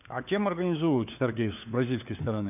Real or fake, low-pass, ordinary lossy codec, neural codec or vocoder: fake; 3.6 kHz; none; codec, 16 kHz, 4 kbps, FunCodec, trained on LibriTTS, 50 frames a second